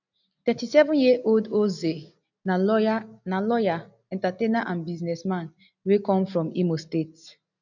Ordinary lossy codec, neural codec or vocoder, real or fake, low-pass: none; none; real; 7.2 kHz